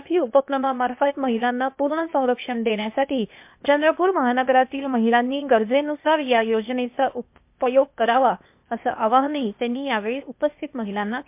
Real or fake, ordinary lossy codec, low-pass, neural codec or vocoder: fake; MP3, 32 kbps; 3.6 kHz; codec, 16 kHz, 0.8 kbps, ZipCodec